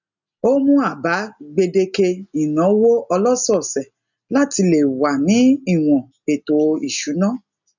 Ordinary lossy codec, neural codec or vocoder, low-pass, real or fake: none; none; 7.2 kHz; real